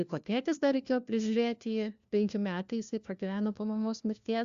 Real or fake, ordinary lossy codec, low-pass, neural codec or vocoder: fake; Opus, 64 kbps; 7.2 kHz; codec, 16 kHz, 1 kbps, FunCodec, trained on LibriTTS, 50 frames a second